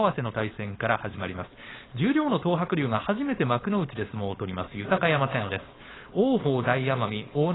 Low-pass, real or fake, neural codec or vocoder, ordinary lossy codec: 7.2 kHz; fake; codec, 16 kHz, 4.8 kbps, FACodec; AAC, 16 kbps